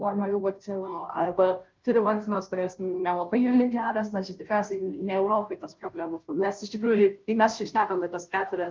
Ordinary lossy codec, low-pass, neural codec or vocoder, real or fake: Opus, 24 kbps; 7.2 kHz; codec, 16 kHz, 0.5 kbps, FunCodec, trained on Chinese and English, 25 frames a second; fake